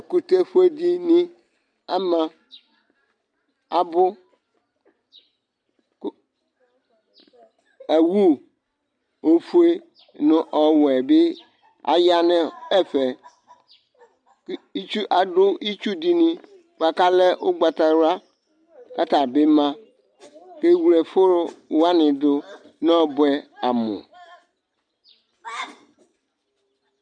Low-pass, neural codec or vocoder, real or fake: 9.9 kHz; none; real